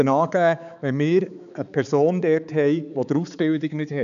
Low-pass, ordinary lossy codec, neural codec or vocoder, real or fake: 7.2 kHz; none; codec, 16 kHz, 4 kbps, X-Codec, HuBERT features, trained on balanced general audio; fake